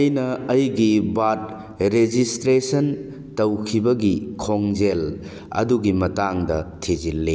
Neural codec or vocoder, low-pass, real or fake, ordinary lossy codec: none; none; real; none